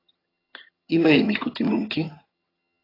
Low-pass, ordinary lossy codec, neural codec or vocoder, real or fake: 5.4 kHz; AAC, 24 kbps; vocoder, 22.05 kHz, 80 mel bands, HiFi-GAN; fake